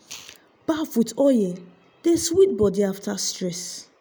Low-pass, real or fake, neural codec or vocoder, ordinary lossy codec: none; real; none; none